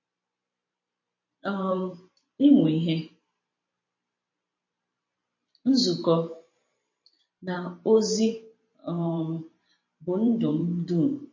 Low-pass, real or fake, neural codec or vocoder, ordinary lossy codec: 7.2 kHz; fake; vocoder, 44.1 kHz, 128 mel bands every 512 samples, BigVGAN v2; MP3, 32 kbps